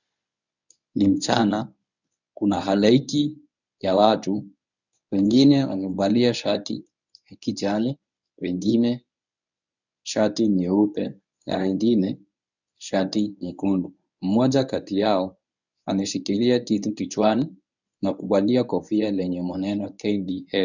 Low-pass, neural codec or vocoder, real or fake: 7.2 kHz; codec, 24 kHz, 0.9 kbps, WavTokenizer, medium speech release version 1; fake